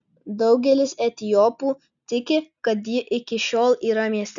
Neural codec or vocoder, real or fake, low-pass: none; real; 7.2 kHz